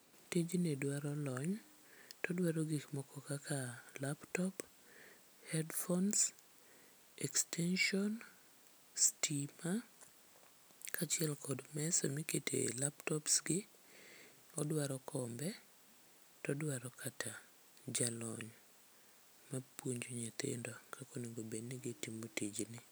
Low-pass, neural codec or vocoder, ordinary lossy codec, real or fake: none; none; none; real